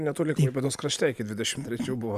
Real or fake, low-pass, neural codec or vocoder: real; 14.4 kHz; none